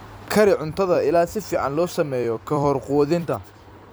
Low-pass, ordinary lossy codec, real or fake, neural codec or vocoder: none; none; fake; vocoder, 44.1 kHz, 128 mel bands every 256 samples, BigVGAN v2